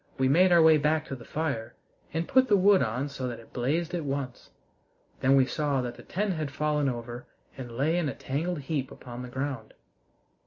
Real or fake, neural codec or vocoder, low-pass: real; none; 7.2 kHz